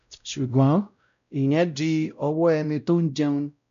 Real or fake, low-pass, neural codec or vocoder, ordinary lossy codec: fake; 7.2 kHz; codec, 16 kHz, 0.5 kbps, X-Codec, WavLM features, trained on Multilingual LibriSpeech; none